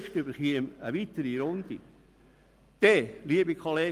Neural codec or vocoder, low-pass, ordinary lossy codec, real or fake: none; 14.4 kHz; Opus, 32 kbps; real